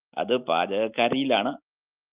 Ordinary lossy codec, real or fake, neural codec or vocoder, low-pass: Opus, 24 kbps; real; none; 3.6 kHz